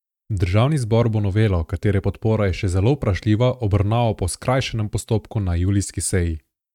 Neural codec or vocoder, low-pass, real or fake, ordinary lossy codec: none; 19.8 kHz; real; none